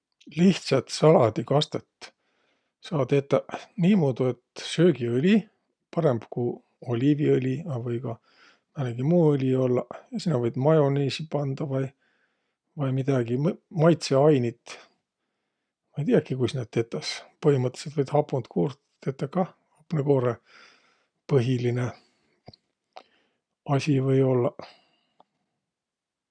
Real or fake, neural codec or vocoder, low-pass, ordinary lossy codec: real; none; 9.9 kHz; none